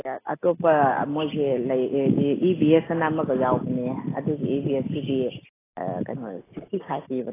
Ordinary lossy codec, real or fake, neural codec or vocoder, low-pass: AAC, 16 kbps; real; none; 3.6 kHz